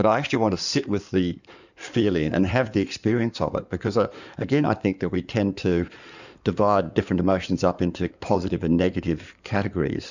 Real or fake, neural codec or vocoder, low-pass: fake; codec, 16 kHz in and 24 kHz out, 2.2 kbps, FireRedTTS-2 codec; 7.2 kHz